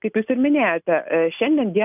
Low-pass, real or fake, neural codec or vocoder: 3.6 kHz; real; none